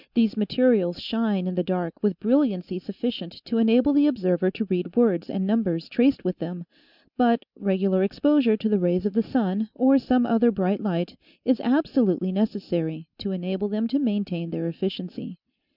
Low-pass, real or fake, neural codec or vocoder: 5.4 kHz; real; none